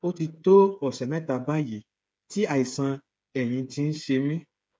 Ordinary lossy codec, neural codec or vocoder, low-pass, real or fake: none; codec, 16 kHz, 8 kbps, FreqCodec, smaller model; none; fake